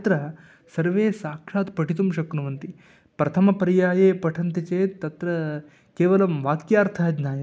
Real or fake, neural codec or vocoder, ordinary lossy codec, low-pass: real; none; none; none